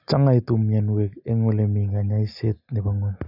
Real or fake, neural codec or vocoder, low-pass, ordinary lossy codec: real; none; 5.4 kHz; none